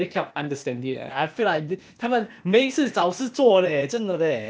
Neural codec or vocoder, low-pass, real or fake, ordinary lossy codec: codec, 16 kHz, 0.8 kbps, ZipCodec; none; fake; none